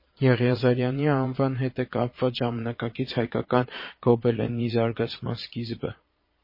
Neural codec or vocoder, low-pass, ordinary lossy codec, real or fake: vocoder, 22.05 kHz, 80 mel bands, Vocos; 5.4 kHz; MP3, 24 kbps; fake